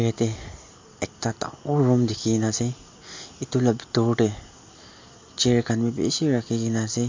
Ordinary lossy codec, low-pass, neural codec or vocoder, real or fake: MP3, 48 kbps; 7.2 kHz; none; real